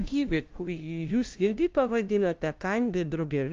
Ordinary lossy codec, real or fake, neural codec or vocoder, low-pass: Opus, 32 kbps; fake; codec, 16 kHz, 0.5 kbps, FunCodec, trained on LibriTTS, 25 frames a second; 7.2 kHz